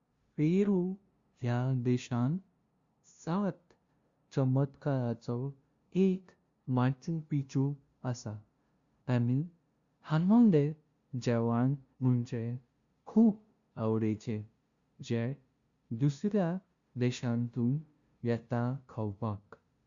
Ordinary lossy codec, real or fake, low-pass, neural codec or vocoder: Opus, 64 kbps; fake; 7.2 kHz; codec, 16 kHz, 0.5 kbps, FunCodec, trained on LibriTTS, 25 frames a second